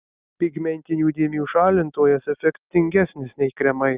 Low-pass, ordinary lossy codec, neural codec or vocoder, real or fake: 3.6 kHz; Opus, 24 kbps; none; real